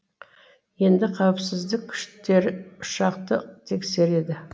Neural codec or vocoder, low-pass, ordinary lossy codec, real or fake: none; none; none; real